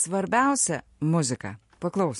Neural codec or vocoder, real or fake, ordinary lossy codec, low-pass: none; real; MP3, 48 kbps; 14.4 kHz